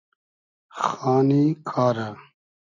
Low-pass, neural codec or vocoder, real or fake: 7.2 kHz; none; real